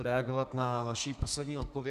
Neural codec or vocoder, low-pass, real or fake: codec, 32 kHz, 1.9 kbps, SNAC; 14.4 kHz; fake